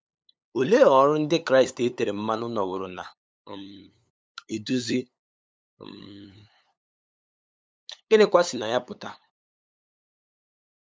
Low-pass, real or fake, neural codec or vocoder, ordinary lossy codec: none; fake; codec, 16 kHz, 8 kbps, FunCodec, trained on LibriTTS, 25 frames a second; none